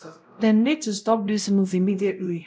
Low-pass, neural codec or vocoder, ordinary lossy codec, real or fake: none; codec, 16 kHz, 0.5 kbps, X-Codec, WavLM features, trained on Multilingual LibriSpeech; none; fake